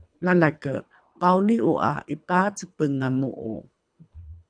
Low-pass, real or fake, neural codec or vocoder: 9.9 kHz; fake; codec, 24 kHz, 3 kbps, HILCodec